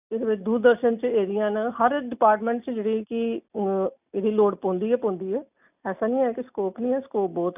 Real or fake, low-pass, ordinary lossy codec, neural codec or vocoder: real; 3.6 kHz; none; none